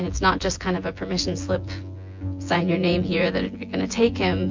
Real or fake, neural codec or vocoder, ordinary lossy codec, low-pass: fake; vocoder, 24 kHz, 100 mel bands, Vocos; MP3, 48 kbps; 7.2 kHz